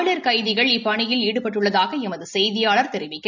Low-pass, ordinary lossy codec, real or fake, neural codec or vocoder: 7.2 kHz; none; real; none